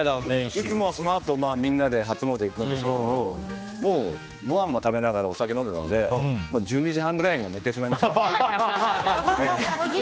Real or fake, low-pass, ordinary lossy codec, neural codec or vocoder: fake; none; none; codec, 16 kHz, 2 kbps, X-Codec, HuBERT features, trained on general audio